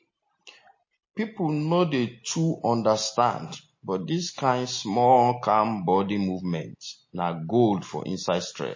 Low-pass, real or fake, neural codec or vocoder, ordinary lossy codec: 7.2 kHz; real; none; MP3, 32 kbps